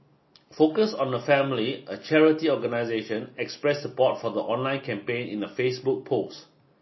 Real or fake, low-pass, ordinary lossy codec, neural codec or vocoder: real; 7.2 kHz; MP3, 24 kbps; none